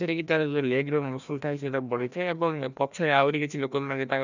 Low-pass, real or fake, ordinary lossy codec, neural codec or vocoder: 7.2 kHz; fake; none; codec, 16 kHz, 1 kbps, FreqCodec, larger model